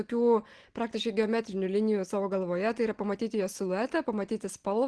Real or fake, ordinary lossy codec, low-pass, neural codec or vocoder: real; Opus, 16 kbps; 10.8 kHz; none